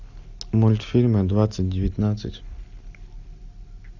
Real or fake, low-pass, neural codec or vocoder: real; 7.2 kHz; none